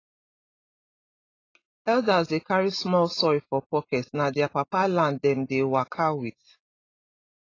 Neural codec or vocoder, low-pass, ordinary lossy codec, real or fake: none; 7.2 kHz; AAC, 32 kbps; real